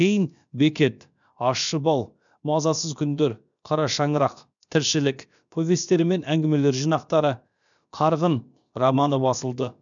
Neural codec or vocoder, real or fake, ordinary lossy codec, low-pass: codec, 16 kHz, about 1 kbps, DyCAST, with the encoder's durations; fake; none; 7.2 kHz